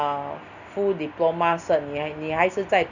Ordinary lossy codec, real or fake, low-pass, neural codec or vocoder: none; real; 7.2 kHz; none